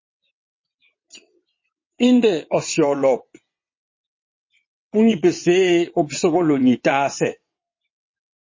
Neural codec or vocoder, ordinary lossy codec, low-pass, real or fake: vocoder, 22.05 kHz, 80 mel bands, WaveNeXt; MP3, 32 kbps; 7.2 kHz; fake